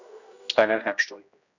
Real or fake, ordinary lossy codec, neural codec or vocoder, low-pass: fake; none; codec, 16 kHz, 1 kbps, X-Codec, HuBERT features, trained on general audio; 7.2 kHz